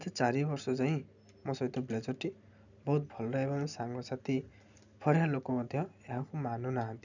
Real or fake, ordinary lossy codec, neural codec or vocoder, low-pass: real; none; none; 7.2 kHz